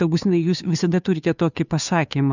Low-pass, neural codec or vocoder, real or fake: 7.2 kHz; codec, 16 kHz, 2 kbps, FunCodec, trained on Chinese and English, 25 frames a second; fake